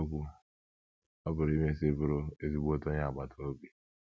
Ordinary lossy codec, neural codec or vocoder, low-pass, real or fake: none; none; none; real